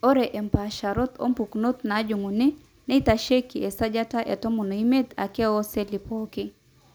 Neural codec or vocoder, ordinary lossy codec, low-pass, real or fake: none; none; none; real